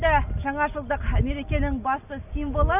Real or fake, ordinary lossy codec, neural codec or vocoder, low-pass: real; none; none; 3.6 kHz